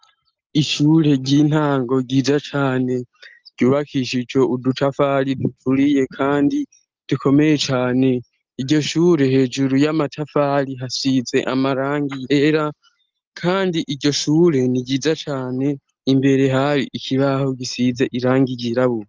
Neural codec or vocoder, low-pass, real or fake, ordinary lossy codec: none; 7.2 kHz; real; Opus, 32 kbps